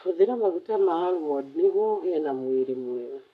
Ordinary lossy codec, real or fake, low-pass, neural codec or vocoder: none; fake; 14.4 kHz; codec, 44.1 kHz, 7.8 kbps, Pupu-Codec